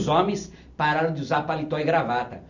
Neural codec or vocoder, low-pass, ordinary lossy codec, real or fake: none; 7.2 kHz; none; real